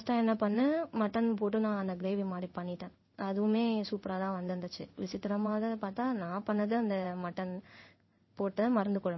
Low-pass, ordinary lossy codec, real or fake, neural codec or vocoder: 7.2 kHz; MP3, 24 kbps; fake; codec, 16 kHz in and 24 kHz out, 1 kbps, XY-Tokenizer